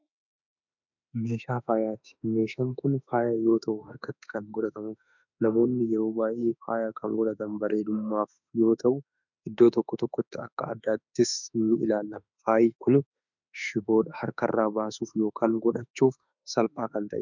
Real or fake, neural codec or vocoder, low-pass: fake; autoencoder, 48 kHz, 32 numbers a frame, DAC-VAE, trained on Japanese speech; 7.2 kHz